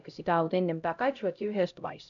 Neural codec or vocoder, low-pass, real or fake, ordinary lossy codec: codec, 16 kHz, 0.5 kbps, X-Codec, HuBERT features, trained on LibriSpeech; 7.2 kHz; fake; none